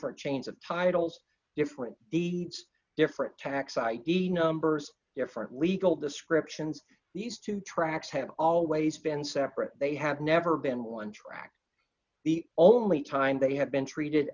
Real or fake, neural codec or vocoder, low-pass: real; none; 7.2 kHz